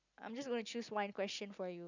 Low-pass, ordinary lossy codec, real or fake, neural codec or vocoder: 7.2 kHz; none; real; none